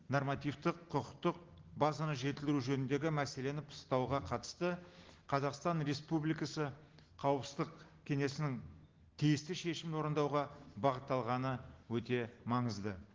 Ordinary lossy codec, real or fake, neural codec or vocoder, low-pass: Opus, 16 kbps; real; none; 7.2 kHz